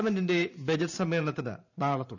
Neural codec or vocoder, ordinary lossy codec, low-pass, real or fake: vocoder, 44.1 kHz, 128 mel bands every 512 samples, BigVGAN v2; none; 7.2 kHz; fake